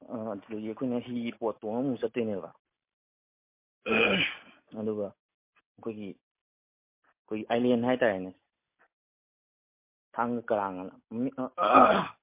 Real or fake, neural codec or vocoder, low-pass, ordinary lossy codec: real; none; 3.6 kHz; MP3, 24 kbps